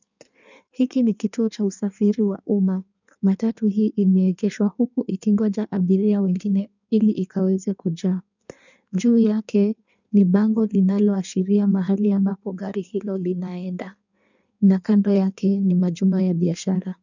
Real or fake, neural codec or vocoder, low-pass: fake; codec, 16 kHz in and 24 kHz out, 1.1 kbps, FireRedTTS-2 codec; 7.2 kHz